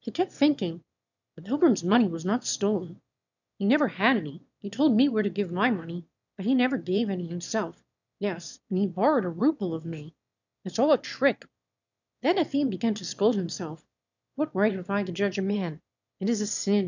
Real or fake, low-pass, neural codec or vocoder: fake; 7.2 kHz; autoencoder, 22.05 kHz, a latent of 192 numbers a frame, VITS, trained on one speaker